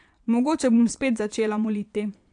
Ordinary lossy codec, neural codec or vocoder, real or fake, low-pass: AAC, 64 kbps; vocoder, 22.05 kHz, 80 mel bands, Vocos; fake; 9.9 kHz